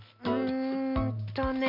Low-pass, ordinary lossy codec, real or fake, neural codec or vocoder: 5.4 kHz; MP3, 48 kbps; real; none